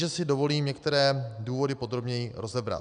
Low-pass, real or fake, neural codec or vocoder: 9.9 kHz; real; none